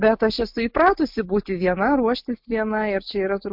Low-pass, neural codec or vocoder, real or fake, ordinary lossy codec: 5.4 kHz; none; real; MP3, 48 kbps